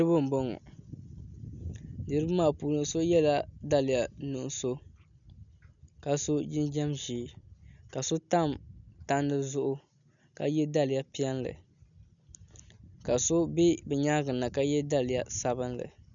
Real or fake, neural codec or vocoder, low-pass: real; none; 7.2 kHz